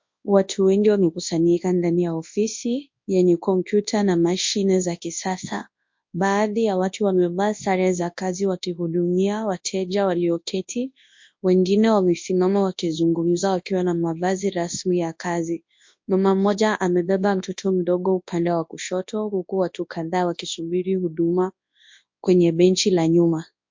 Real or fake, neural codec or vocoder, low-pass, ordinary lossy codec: fake; codec, 24 kHz, 0.9 kbps, WavTokenizer, large speech release; 7.2 kHz; MP3, 48 kbps